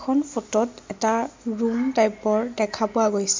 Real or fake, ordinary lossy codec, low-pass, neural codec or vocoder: real; MP3, 48 kbps; 7.2 kHz; none